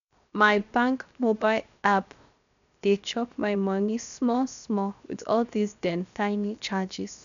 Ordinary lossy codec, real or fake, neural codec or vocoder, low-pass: none; fake; codec, 16 kHz, 0.7 kbps, FocalCodec; 7.2 kHz